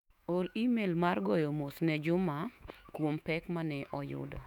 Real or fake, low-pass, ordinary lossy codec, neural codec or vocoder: fake; 19.8 kHz; none; autoencoder, 48 kHz, 128 numbers a frame, DAC-VAE, trained on Japanese speech